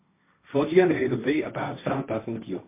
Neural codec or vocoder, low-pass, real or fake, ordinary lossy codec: codec, 16 kHz, 1.1 kbps, Voila-Tokenizer; 3.6 kHz; fake; MP3, 24 kbps